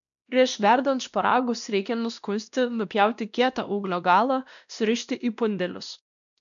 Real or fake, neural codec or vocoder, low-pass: fake; codec, 16 kHz, 1 kbps, X-Codec, WavLM features, trained on Multilingual LibriSpeech; 7.2 kHz